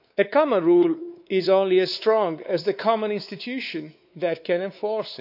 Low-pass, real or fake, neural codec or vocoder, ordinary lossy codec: 5.4 kHz; fake; codec, 16 kHz, 4 kbps, X-Codec, WavLM features, trained on Multilingual LibriSpeech; none